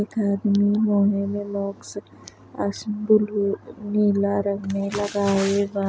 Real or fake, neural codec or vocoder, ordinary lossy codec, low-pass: real; none; none; none